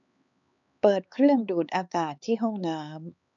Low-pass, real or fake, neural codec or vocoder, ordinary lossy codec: 7.2 kHz; fake; codec, 16 kHz, 4 kbps, X-Codec, HuBERT features, trained on LibriSpeech; none